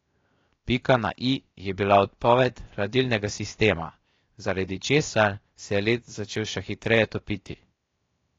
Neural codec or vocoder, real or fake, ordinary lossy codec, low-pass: codec, 16 kHz, 0.7 kbps, FocalCodec; fake; AAC, 32 kbps; 7.2 kHz